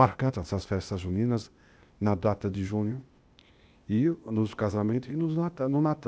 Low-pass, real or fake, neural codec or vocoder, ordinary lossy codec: none; fake; codec, 16 kHz, 0.8 kbps, ZipCodec; none